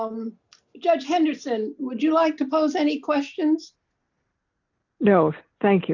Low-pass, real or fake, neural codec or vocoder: 7.2 kHz; real; none